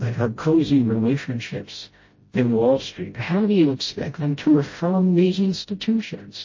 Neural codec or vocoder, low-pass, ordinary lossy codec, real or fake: codec, 16 kHz, 0.5 kbps, FreqCodec, smaller model; 7.2 kHz; MP3, 32 kbps; fake